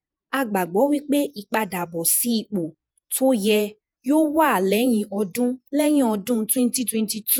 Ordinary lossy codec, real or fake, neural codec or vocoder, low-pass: none; fake; vocoder, 48 kHz, 128 mel bands, Vocos; none